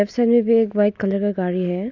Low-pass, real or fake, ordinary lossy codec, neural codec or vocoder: 7.2 kHz; real; none; none